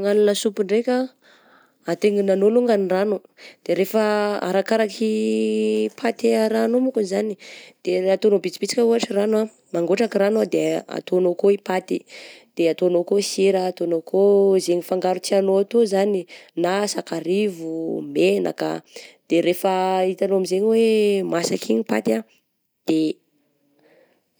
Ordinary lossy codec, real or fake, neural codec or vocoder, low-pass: none; real; none; none